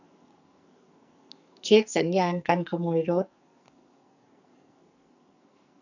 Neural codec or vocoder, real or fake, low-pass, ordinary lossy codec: codec, 44.1 kHz, 2.6 kbps, SNAC; fake; 7.2 kHz; none